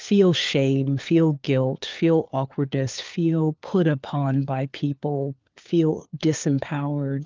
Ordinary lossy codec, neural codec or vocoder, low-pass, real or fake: Opus, 24 kbps; codec, 16 kHz, 4 kbps, FunCodec, trained on LibriTTS, 50 frames a second; 7.2 kHz; fake